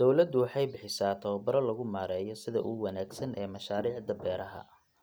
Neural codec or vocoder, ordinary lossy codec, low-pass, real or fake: none; none; none; real